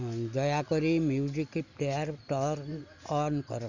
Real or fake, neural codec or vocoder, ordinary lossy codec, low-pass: real; none; none; 7.2 kHz